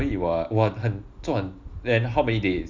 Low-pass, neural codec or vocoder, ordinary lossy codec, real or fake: 7.2 kHz; none; none; real